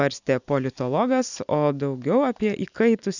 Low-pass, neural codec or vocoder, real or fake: 7.2 kHz; none; real